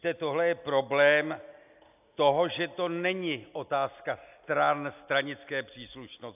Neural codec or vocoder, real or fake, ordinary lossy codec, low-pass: none; real; AAC, 32 kbps; 3.6 kHz